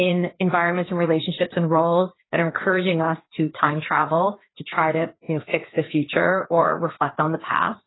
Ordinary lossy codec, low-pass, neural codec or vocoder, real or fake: AAC, 16 kbps; 7.2 kHz; codec, 16 kHz, 2 kbps, FreqCodec, larger model; fake